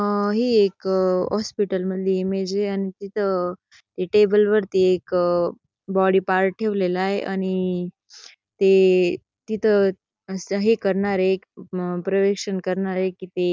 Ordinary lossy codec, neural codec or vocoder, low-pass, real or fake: none; none; none; real